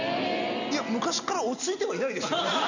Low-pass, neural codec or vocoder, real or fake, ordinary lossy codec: 7.2 kHz; none; real; none